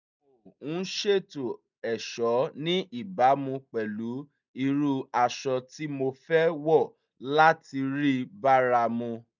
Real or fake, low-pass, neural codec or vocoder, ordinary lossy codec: real; 7.2 kHz; none; none